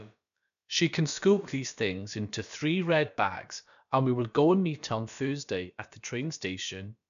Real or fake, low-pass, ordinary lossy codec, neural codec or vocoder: fake; 7.2 kHz; none; codec, 16 kHz, about 1 kbps, DyCAST, with the encoder's durations